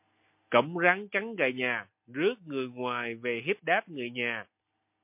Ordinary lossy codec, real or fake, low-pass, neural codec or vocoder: MP3, 32 kbps; real; 3.6 kHz; none